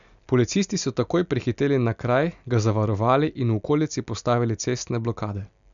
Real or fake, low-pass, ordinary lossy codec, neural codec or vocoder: real; 7.2 kHz; none; none